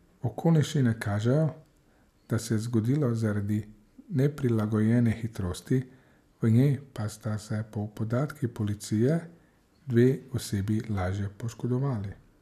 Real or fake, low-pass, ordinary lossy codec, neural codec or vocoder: real; 14.4 kHz; none; none